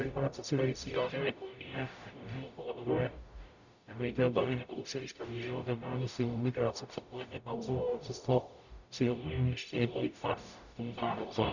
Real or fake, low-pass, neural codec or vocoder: fake; 7.2 kHz; codec, 44.1 kHz, 0.9 kbps, DAC